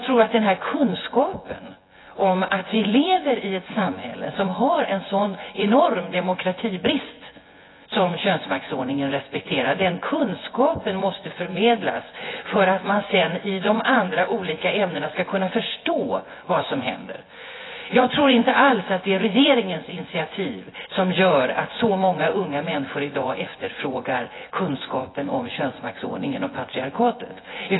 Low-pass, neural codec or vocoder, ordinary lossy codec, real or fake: 7.2 kHz; vocoder, 24 kHz, 100 mel bands, Vocos; AAC, 16 kbps; fake